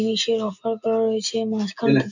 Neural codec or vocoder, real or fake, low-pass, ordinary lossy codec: none; real; 7.2 kHz; none